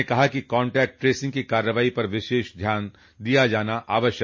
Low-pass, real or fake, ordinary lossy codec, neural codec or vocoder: 7.2 kHz; real; MP3, 32 kbps; none